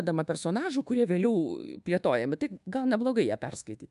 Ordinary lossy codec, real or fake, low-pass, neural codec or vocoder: MP3, 96 kbps; fake; 10.8 kHz; codec, 24 kHz, 1.2 kbps, DualCodec